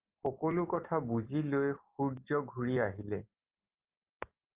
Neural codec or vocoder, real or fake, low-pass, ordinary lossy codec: none; real; 3.6 kHz; Opus, 24 kbps